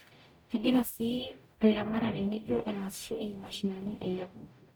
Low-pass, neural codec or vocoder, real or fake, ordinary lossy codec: none; codec, 44.1 kHz, 0.9 kbps, DAC; fake; none